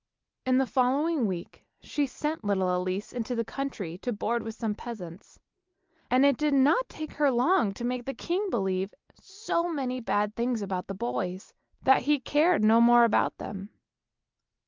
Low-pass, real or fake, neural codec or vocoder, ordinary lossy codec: 7.2 kHz; real; none; Opus, 24 kbps